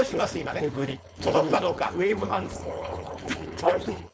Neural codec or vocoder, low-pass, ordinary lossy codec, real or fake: codec, 16 kHz, 4.8 kbps, FACodec; none; none; fake